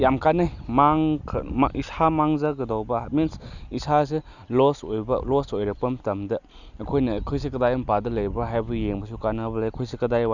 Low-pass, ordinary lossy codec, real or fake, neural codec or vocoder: 7.2 kHz; none; real; none